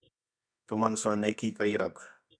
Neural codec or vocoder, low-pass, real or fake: codec, 24 kHz, 0.9 kbps, WavTokenizer, medium music audio release; 9.9 kHz; fake